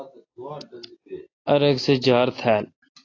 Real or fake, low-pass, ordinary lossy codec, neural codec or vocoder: real; 7.2 kHz; AAC, 32 kbps; none